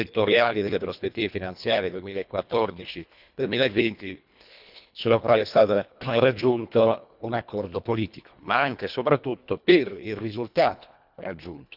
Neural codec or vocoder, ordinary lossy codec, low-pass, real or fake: codec, 24 kHz, 1.5 kbps, HILCodec; none; 5.4 kHz; fake